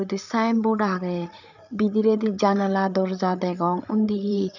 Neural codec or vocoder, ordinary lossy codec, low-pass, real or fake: codec, 16 kHz, 8 kbps, FreqCodec, larger model; none; 7.2 kHz; fake